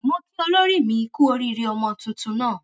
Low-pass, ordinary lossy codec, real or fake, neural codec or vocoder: none; none; real; none